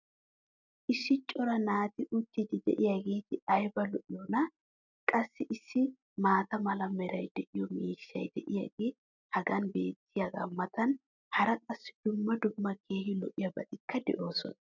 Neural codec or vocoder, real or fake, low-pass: none; real; 7.2 kHz